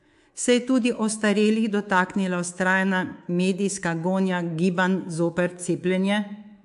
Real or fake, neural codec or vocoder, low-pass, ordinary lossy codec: fake; codec, 24 kHz, 3.1 kbps, DualCodec; 10.8 kHz; AAC, 64 kbps